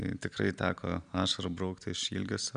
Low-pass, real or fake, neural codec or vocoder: 9.9 kHz; real; none